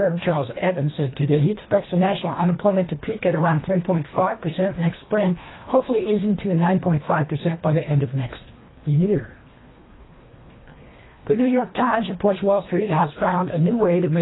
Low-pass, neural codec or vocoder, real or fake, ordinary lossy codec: 7.2 kHz; codec, 24 kHz, 1.5 kbps, HILCodec; fake; AAC, 16 kbps